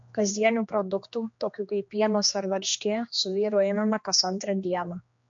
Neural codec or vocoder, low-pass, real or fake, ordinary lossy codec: codec, 16 kHz, 2 kbps, X-Codec, HuBERT features, trained on general audio; 7.2 kHz; fake; MP3, 48 kbps